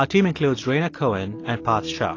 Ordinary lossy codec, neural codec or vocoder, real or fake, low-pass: AAC, 32 kbps; none; real; 7.2 kHz